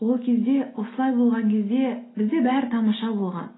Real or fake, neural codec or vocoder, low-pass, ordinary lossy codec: real; none; 7.2 kHz; AAC, 16 kbps